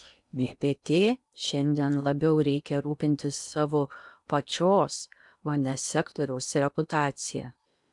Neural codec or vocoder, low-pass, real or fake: codec, 16 kHz in and 24 kHz out, 0.8 kbps, FocalCodec, streaming, 65536 codes; 10.8 kHz; fake